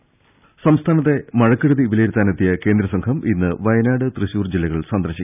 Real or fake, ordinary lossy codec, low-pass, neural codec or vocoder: real; none; 3.6 kHz; none